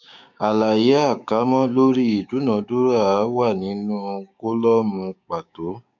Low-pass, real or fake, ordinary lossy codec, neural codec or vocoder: 7.2 kHz; fake; none; codec, 16 kHz, 6 kbps, DAC